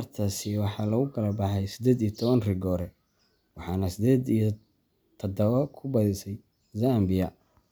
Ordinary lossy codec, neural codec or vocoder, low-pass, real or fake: none; none; none; real